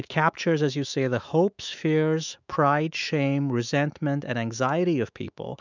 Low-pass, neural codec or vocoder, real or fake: 7.2 kHz; autoencoder, 48 kHz, 128 numbers a frame, DAC-VAE, trained on Japanese speech; fake